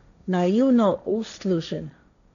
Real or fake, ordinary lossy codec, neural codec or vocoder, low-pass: fake; none; codec, 16 kHz, 1.1 kbps, Voila-Tokenizer; 7.2 kHz